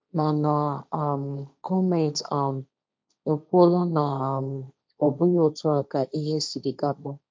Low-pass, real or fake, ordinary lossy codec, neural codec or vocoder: none; fake; none; codec, 16 kHz, 1.1 kbps, Voila-Tokenizer